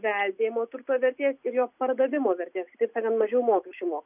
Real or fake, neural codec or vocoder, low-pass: real; none; 3.6 kHz